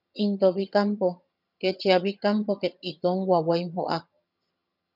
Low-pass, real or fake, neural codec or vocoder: 5.4 kHz; fake; vocoder, 22.05 kHz, 80 mel bands, HiFi-GAN